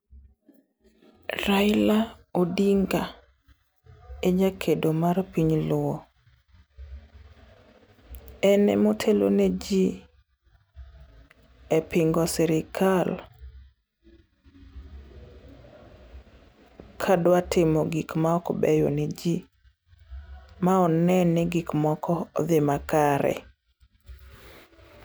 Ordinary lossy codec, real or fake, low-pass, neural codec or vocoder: none; real; none; none